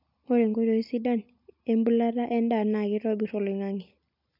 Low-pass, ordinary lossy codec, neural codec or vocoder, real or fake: 5.4 kHz; MP3, 48 kbps; none; real